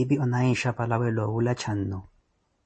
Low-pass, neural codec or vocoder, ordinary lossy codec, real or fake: 10.8 kHz; none; MP3, 32 kbps; real